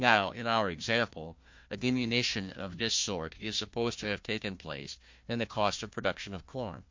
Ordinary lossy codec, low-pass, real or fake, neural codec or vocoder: MP3, 48 kbps; 7.2 kHz; fake; codec, 16 kHz, 1 kbps, FunCodec, trained on Chinese and English, 50 frames a second